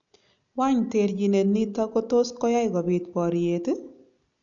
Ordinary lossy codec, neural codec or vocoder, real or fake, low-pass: none; none; real; 7.2 kHz